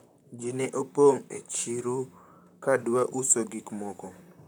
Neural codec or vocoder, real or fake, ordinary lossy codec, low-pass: vocoder, 44.1 kHz, 128 mel bands, Pupu-Vocoder; fake; none; none